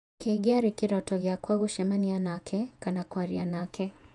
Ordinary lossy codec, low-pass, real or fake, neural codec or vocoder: none; 10.8 kHz; fake; vocoder, 48 kHz, 128 mel bands, Vocos